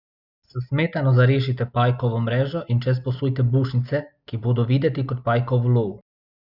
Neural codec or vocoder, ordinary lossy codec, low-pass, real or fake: none; Opus, 64 kbps; 5.4 kHz; real